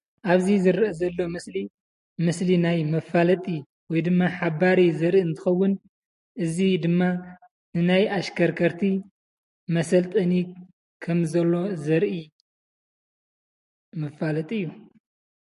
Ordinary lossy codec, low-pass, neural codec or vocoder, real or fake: MP3, 48 kbps; 14.4 kHz; none; real